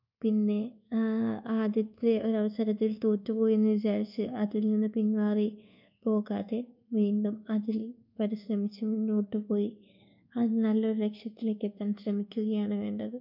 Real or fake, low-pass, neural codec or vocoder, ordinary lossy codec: fake; 5.4 kHz; codec, 24 kHz, 1.2 kbps, DualCodec; none